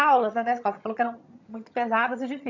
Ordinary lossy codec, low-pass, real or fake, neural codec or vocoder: none; 7.2 kHz; fake; vocoder, 22.05 kHz, 80 mel bands, HiFi-GAN